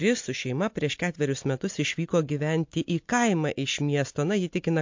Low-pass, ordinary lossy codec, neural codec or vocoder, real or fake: 7.2 kHz; MP3, 48 kbps; none; real